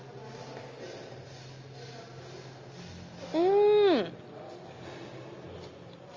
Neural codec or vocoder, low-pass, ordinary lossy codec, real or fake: none; 7.2 kHz; Opus, 32 kbps; real